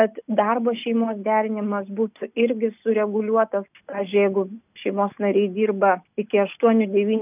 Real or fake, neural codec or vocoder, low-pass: real; none; 3.6 kHz